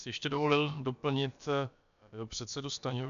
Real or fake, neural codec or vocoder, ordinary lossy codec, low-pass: fake; codec, 16 kHz, about 1 kbps, DyCAST, with the encoder's durations; AAC, 96 kbps; 7.2 kHz